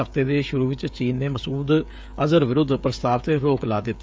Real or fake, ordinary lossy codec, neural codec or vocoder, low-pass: fake; none; codec, 16 kHz, 16 kbps, FreqCodec, smaller model; none